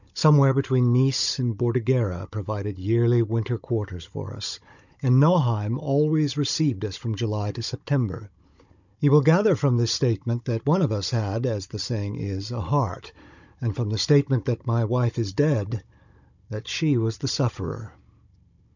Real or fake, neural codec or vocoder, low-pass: fake; codec, 16 kHz, 16 kbps, FunCodec, trained on Chinese and English, 50 frames a second; 7.2 kHz